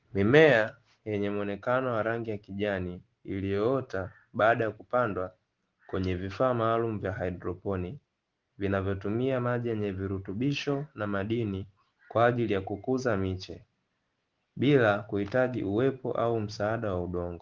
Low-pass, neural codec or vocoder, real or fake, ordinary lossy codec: 7.2 kHz; none; real; Opus, 16 kbps